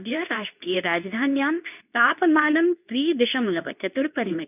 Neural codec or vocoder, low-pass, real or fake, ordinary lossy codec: codec, 24 kHz, 0.9 kbps, WavTokenizer, medium speech release version 2; 3.6 kHz; fake; none